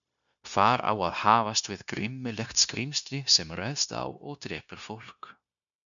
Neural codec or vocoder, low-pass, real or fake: codec, 16 kHz, 0.9 kbps, LongCat-Audio-Codec; 7.2 kHz; fake